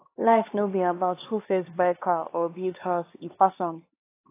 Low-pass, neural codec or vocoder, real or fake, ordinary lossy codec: 3.6 kHz; codec, 16 kHz, 2 kbps, X-Codec, HuBERT features, trained on LibriSpeech; fake; AAC, 24 kbps